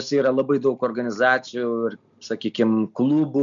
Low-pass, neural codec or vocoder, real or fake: 7.2 kHz; none; real